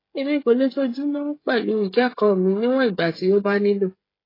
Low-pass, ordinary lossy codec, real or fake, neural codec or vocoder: 5.4 kHz; AAC, 24 kbps; fake; codec, 16 kHz, 16 kbps, FreqCodec, smaller model